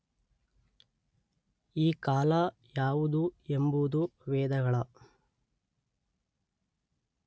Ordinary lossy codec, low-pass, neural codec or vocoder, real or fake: none; none; none; real